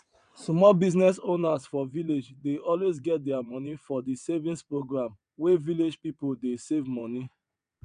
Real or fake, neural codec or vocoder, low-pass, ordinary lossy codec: fake; vocoder, 22.05 kHz, 80 mel bands, WaveNeXt; 9.9 kHz; none